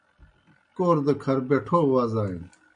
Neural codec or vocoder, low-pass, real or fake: none; 9.9 kHz; real